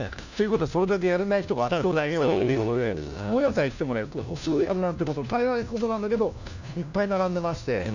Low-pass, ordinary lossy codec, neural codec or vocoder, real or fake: 7.2 kHz; none; codec, 16 kHz, 1 kbps, FunCodec, trained on LibriTTS, 50 frames a second; fake